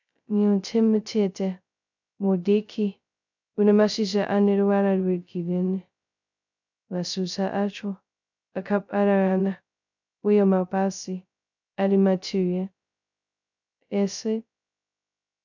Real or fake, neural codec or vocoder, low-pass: fake; codec, 16 kHz, 0.2 kbps, FocalCodec; 7.2 kHz